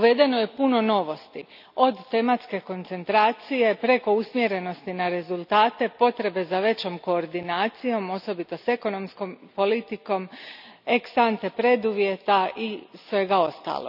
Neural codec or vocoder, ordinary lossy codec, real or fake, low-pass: none; none; real; 5.4 kHz